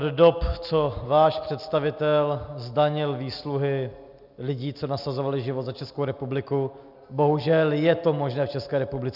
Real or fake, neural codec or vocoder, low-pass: real; none; 5.4 kHz